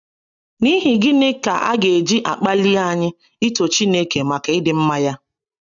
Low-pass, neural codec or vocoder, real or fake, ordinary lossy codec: 7.2 kHz; none; real; none